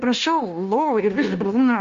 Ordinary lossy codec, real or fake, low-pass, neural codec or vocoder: Opus, 32 kbps; fake; 7.2 kHz; codec, 16 kHz, 0.9 kbps, LongCat-Audio-Codec